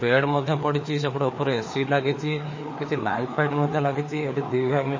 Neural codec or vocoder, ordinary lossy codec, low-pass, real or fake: codec, 16 kHz, 4 kbps, FreqCodec, larger model; MP3, 32 kbps; 7.2 kHz; fake